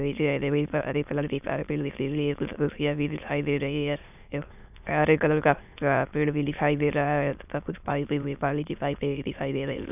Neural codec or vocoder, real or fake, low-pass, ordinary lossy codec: autoencoder, 22.05 kHz, a latent of 192 numbers a frame, VITS, trained on many speakers; fake; 3.6 kHz; none